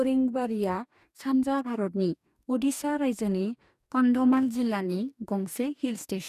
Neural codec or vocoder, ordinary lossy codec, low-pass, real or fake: codec, 44.1 kHz, 2.6 kbps, DAC; none; 14.4 kHz; fake